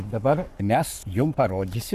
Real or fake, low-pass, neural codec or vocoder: fake; 14.4 kHz; codec, 44.1 kHz, 7.8 kbps, Pupu-Codec